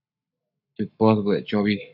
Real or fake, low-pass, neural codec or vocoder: fake; 5.4 kHz; codec, 44.1 kHz, 7.8 kbps, Pupu-Codec